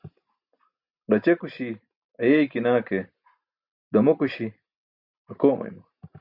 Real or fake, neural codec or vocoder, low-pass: real; none; 5.4 kHz